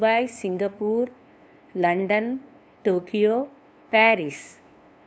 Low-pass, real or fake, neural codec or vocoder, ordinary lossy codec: none; fake; codec, 16 kHz, 2 kbps, FunCodec, trained on LibriTTS, 25 frames a second; none